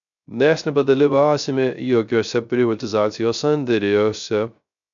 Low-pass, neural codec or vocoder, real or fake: 7.2 kHz; codec, 16 kHz, 0.3 kbps, FocalCodec; fake